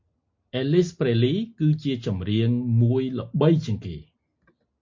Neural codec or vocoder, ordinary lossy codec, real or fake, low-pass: none; MP3, 48 kbps; real; 7.2 kHz